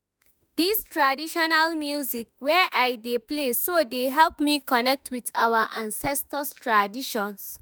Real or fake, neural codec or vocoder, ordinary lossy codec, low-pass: fake; autoencoder, 48 kHz, 32 numbers a frame, DAC-VAE, trained on Japanese speech; none; none